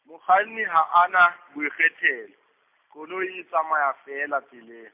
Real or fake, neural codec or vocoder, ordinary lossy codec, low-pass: real; none; MP3, 32 kbps; 3.6 kHz